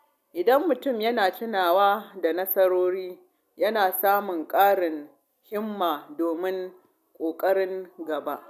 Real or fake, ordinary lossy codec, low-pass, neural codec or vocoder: real; none; 14.4 kHz; none